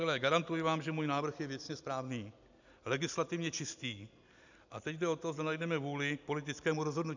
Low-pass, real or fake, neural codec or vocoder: 7.2 kHz; real; none